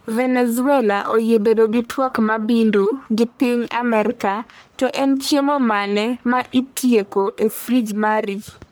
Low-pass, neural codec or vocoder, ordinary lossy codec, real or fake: none; codec, 44.1 kHz, 1.7 kbps, Pupu-Codec; none; fake